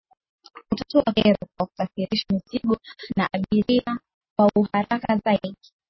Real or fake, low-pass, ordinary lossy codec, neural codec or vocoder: real; 7.2 kHz; MP3, 24 kbps; none